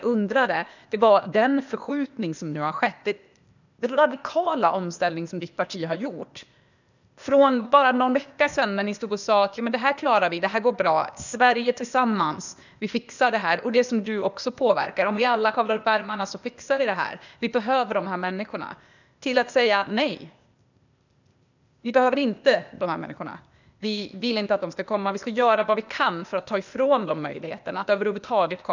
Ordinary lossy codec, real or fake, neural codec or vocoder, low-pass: none; fake; codec, 16 kHz, 0.8 kbps, ZipCodec; 7.2 kHz